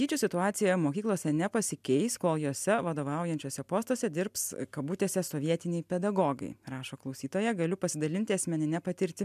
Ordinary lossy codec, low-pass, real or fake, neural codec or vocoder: MP3, 96 kbps; 14.4 kHz; real; none